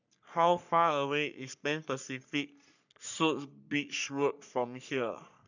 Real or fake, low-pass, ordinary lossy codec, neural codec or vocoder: fake; 7.2 kHz; none; codec, 44.1 kHz, 3.4 kbps, Pupu-Codec